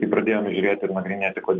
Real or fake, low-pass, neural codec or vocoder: real; 7.2 kHz; none